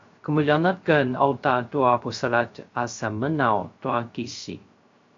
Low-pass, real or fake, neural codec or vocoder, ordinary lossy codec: 7.2 kHz; fake; codec, 16 kHz, 0.3 kbps, FocalCodec; AAC, 48 kbps